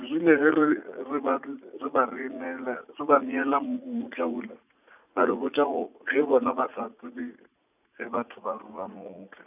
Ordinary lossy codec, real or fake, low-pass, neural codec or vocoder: none; fake; 3.6 kHz; vocoder, 44.1 kHz, 80 mel bands, Vocos